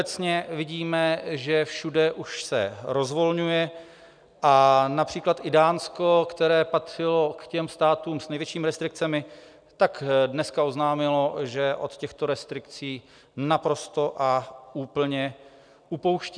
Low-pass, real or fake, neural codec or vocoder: 9.9 kHz; real; none